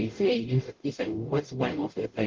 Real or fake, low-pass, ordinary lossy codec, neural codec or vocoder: fake; 7.2 kHz; Opus, 16 kbps; codec, 44.1 kHz, 0.9 kbps, DAC